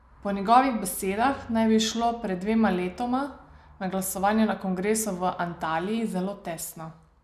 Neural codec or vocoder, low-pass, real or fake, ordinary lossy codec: none; 14.4 kHz; real; none